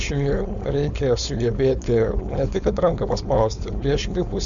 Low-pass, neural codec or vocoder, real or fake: 7.2 kHz; codec, 16 kHz, 4.8 kbps, FACodec; fake